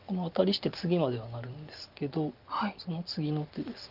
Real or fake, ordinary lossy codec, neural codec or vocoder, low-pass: real; Opus, 24 kbps; none; 5.4 kHz